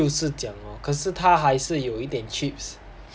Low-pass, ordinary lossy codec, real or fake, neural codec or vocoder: none; none; real; none